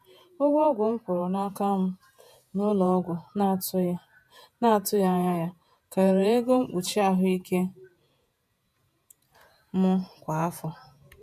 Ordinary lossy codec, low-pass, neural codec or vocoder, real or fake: none; 14.4 kHz; vocoder, 48 kHz, 128 mel bands, Vocos; fake